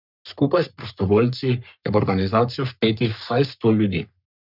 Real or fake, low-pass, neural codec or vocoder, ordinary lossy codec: fake; 5.4 kHz; codec, 44.1 kHz, 3.4 kbps, Pupu-Codec; none